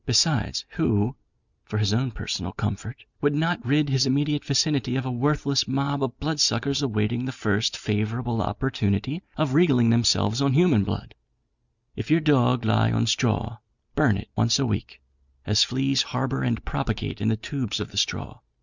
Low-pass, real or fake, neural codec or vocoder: 7.2 kHz; real; none